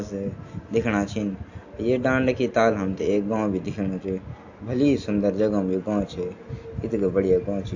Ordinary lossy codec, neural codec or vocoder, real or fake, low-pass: AAC, 48 kbps; none; real; 7.2 kHz